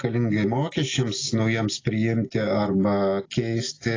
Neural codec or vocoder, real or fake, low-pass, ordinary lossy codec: none; real; 7.2 kHz; AAC, 32 kbps